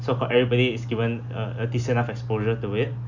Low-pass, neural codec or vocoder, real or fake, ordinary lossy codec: 7.2 kHz; none; real; none